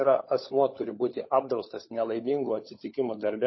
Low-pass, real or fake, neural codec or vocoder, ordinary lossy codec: 7.2 kHz; fake; codec, 16 kHz, 16 kbps, FunCodec, trained on LibriTTS, 50 frames a second; MP3, 24 kbps